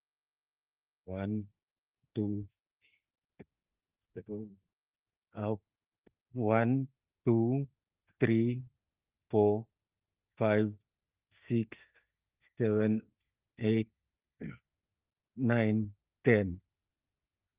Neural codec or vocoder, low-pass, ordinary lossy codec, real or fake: none; 3.6 kHz; Opus, 32 kbps; real